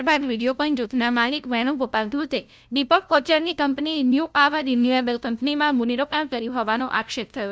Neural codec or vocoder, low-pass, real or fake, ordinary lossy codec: codec, 16 kHz, 0.5 kbps, FunCodec, trained on LibriTTS, 25 frames a second; none; fake; none